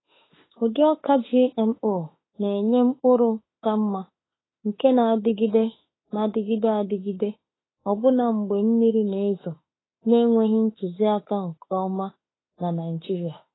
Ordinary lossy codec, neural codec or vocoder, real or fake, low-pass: AAC, 16 kbps; autoencoder, 48 kHz, 32 numbers a frame, DAC-VAE, trained on Japanese speech; fake; 7.2 kHz